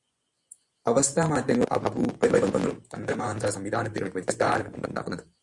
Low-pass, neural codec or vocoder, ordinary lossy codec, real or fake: 10.8 kHz; vocoder, 24 kHz, 100 mel bands, Vocos; Opus, 64 kbps; fake